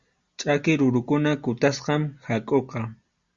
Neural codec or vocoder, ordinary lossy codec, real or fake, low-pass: none; Opus, 64 kbps; real; 7.2 kHz